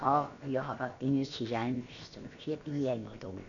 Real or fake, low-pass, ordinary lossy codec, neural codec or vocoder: fake; 7.2 kHz; none; codec, 16 kHz, about 1 kbps, DyCAST, with the encoder's durations